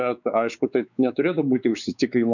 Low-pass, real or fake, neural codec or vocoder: 7.2 kHz; fake; codec, 16 kHz, 4 kbps, X-Codec, WavLM features, trained on Multilingual LibriSpeech